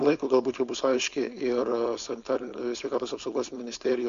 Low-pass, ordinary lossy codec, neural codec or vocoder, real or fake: 7.2 kHz; Opus, 64 kbps; codec, 16 kHz, 4.8 kbps, FACodec; fake